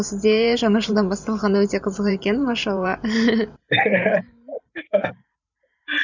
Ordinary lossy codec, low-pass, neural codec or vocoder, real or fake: none; 7.2 kHz; vocoder, 44.1 kHz, 128 mel bands every 512 samples, BigVGAN v2; fake